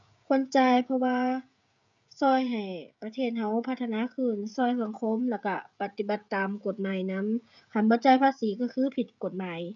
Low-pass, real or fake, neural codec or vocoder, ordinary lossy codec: 7.2 kHz; fake; codec, 16 kHz, 16 kbps, FreqCodec, smaller model; MP3, 96 kbps